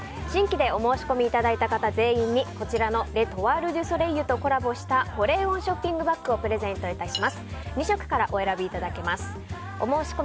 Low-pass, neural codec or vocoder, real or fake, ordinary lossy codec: none; none; real; none